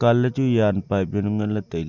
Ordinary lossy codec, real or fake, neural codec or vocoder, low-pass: Opus, 64 kbps; real; none; 7.2 kHz